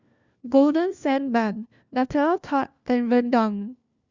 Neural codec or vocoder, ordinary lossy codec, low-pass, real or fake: codec, 16 kHz, 1 kbps, FunCodec, trained on LibriTTS, 50 frames a second; Opus, 64 kbps; 7.2 kHz; fake